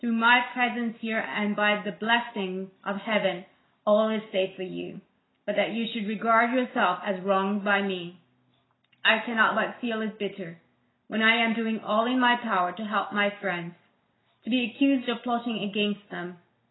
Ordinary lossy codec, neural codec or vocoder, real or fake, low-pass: AAC, 16 kbps; none; real; 7.2 kHz